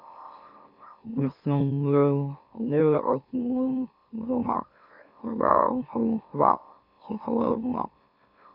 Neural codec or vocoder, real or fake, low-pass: autoencoder, 44.1 kHz, a latent of 192 numbers a frame, MeloTTS; fake; 5.4 kHz